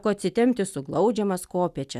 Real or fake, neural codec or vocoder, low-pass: real; none; 14.4 kHz